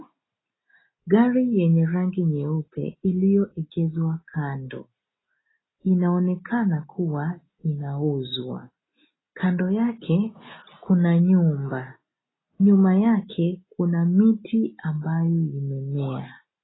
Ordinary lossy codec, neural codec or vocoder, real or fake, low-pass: AAC, 16 kbps; none; real; 7.2 kHz